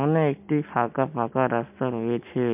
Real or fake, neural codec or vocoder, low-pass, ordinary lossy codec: real; none; 3.6 kHz; MP3, 32 kbps